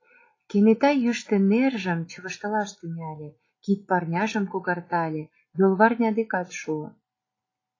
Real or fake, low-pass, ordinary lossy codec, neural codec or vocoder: real; 7.2 kHz; AAC, 32 kbps; none